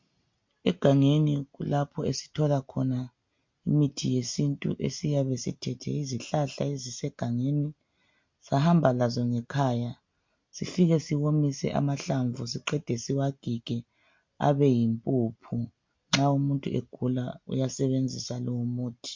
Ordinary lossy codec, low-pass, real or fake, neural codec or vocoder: MP3, 48 kbps; 7.2 kHz; real; none